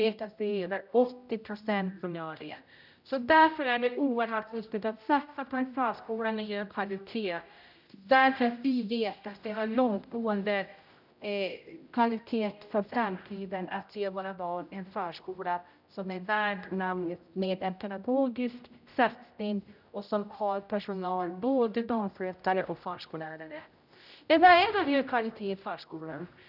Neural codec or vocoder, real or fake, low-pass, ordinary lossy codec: codec, 16 kHz, 0.5 kbps, X-Codec, HuBERT features, trained on general audio; fake; 5.4 kHz; none